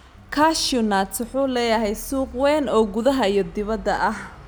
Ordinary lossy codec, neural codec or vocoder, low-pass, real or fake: none; none; none; real